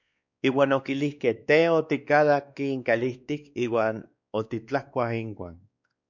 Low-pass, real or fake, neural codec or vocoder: 7.2 kHz; fake; codec, 16 kHz, 2 kbps, X-Codec, WavLM features, trained on Multilingual LibriSpeech